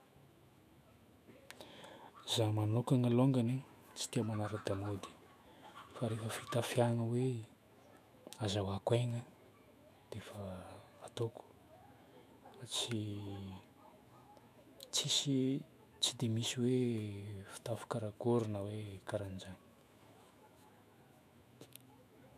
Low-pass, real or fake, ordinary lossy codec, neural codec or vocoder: 14.4 kHz; fake; none; autoencoder, 48 kHz, 128 numbers a frame, DAC-VAE, trained on Japanese speech